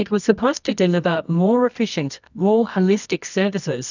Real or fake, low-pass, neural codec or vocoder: fake; 7.2 kHz; codec, 24 kHz, 0.9 kbps, WavTokenizer, medium music audio release